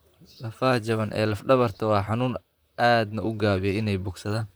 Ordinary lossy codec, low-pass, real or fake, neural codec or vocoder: none; none; fake; vocoder, 44.1 kHz, 128 mel bands, Pupu-Vocoder